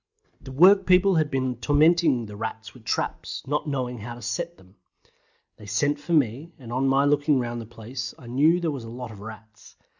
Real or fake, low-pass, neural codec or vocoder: real; 7.2 kHz; none